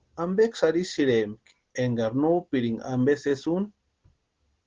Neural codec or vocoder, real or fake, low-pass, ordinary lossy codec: none; real; 7.2 kHz; Opus, 16 kbps